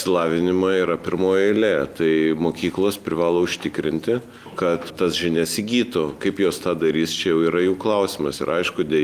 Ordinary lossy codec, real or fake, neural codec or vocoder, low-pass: Opus, 32 kbps; real; none; 14.4 kHz